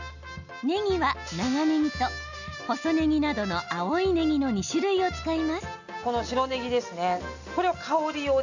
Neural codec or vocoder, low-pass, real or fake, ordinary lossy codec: none; 7.2 kHz; real; none